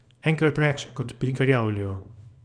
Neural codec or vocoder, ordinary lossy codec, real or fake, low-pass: codec, 24 kHz, 0.9 kbps, WavTokenizer, small release; none; fake; 9.9 kHz